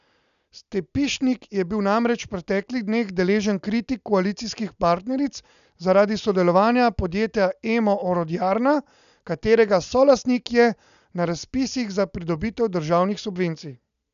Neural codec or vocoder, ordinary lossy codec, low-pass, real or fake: none; none; 7.2 kHz; real